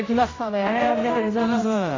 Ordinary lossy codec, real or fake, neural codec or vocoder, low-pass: AAC, 32 kbps; fake; codec, 16 kHz, 0.5 kbps, X-Codec, HuBERT features, trained on general audio; 7.2 kHz